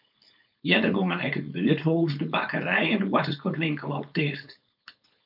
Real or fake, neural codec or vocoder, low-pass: fake; codec, 16 kHz, 4.8 kbps, FACodec; 5.4 kHz